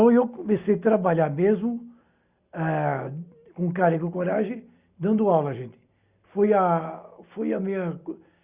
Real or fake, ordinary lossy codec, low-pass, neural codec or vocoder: real; Opus, 64 kbps; 3.6 kHz; none